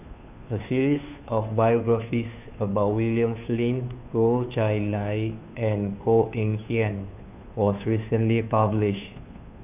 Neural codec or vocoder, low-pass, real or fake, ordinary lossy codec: codec, 16 kHz, 2 kbps, FunCodec, trained on Chinese and English, 25 frames a second; 3.6 kHz; fake; none